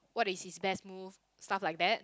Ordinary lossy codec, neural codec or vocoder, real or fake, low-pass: none; none; real; none